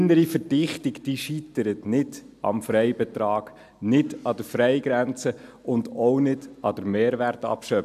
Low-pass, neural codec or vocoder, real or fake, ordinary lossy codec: 14.4 kHz; none; real; AAC, 96 kbps